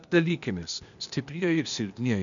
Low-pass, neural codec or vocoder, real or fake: 7.2 kHz; codec, 16 kHz, 0.8 kbps, ZipCodec; fake